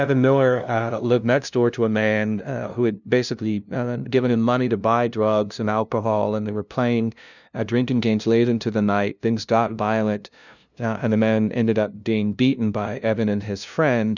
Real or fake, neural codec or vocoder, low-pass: fake; codec, 16 kHz, 0.5 kbps, FunCodec, trained on LibriTTS, 25 frames a second; 7.2 kHz